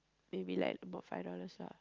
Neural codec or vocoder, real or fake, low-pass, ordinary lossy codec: none; real; 7.2 kHz; Opus, 32 kbps